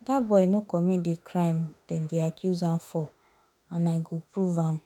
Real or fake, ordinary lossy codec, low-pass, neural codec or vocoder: fake; none; 19.8 kHz; autoencoder, 48 kHz, 32 numbers a frame, DAC-VAE, trained on Japanese speech